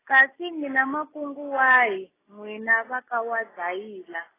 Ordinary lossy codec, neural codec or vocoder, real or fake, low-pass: AAC, 16 kbps; none; real; 3.6 kHz